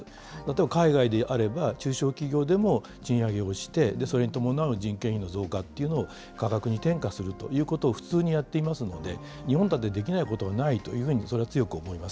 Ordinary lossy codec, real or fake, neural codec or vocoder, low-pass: none; real; none; none